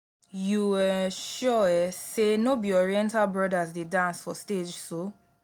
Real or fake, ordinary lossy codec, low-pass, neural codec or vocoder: real; none; none; none